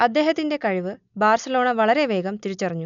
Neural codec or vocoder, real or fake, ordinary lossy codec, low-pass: none; real; none; 7.2 kHz